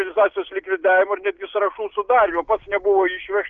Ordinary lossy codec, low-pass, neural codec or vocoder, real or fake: Opus, 32 kbps; 7.2 kHz; none; real